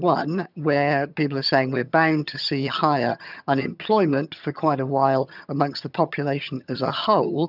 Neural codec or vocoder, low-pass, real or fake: vocoder, 22.05 kHz, 80 mel bands, HiFi-GAN; 5.4 kHz; fake